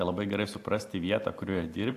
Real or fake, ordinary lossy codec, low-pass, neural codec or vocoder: real; AAC, 64 kbps; 14.4 kHz; none